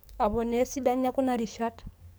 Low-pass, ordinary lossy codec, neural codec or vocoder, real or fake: none; none; codec, 44.1 kHz, 7.8 kbps, DAC; fake